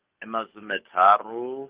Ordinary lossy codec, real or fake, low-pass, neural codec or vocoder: Opus, 24 kbps; fake; 3.6 kHz; codec, 44.1 kHz, 7.8 kbps, DAC